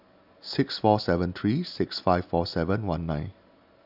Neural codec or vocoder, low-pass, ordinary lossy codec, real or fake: none; 5.4 kHz; none; real